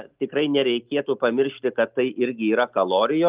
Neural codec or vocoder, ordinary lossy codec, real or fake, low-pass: none; Opus, 32 kbps; real; 3.6 kHz